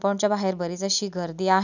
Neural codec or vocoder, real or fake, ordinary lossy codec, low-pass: none; real; none; 7.2 kHz